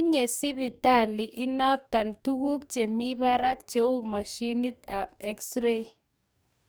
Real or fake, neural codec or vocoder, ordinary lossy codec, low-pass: fake; codec, 44.1 kHz, 2.6 kbps, DAC; none; none